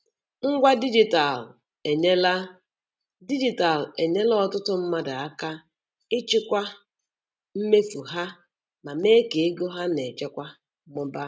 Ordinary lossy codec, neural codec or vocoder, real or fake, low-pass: none; none; real; none